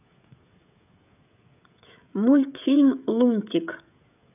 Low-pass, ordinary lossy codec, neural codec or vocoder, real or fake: 3.6 kHz; none; codec, 16 kHz, 16 kbps, FreqCodec, smaller model; fake